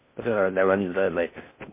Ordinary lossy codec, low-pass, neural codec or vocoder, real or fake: MP3, 24 kbps; 3.6 kHz; codec, 16 kHz in and 24 kHz out, 0.6 kbps, FocalCodec, streaming, 4096 codes; fake